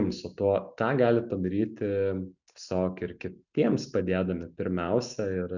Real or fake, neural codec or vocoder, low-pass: real; none; 7.2 kHz